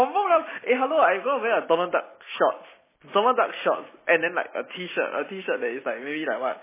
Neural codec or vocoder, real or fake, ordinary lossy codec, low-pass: none; real; MP3, 16 kbps; 3.6 kHz